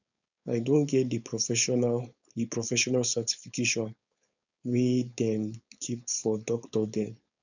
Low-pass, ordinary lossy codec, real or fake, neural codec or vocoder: 7.2 kHz; none; fake; codec, 16 kHz, 4.8 kbps, FACodec